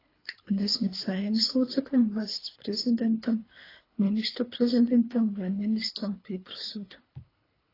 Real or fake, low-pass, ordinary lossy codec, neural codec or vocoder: fake; 5.4 kHz; AAC, 24 kbps; codec, 24 kHz, 3 kbps, HILCodec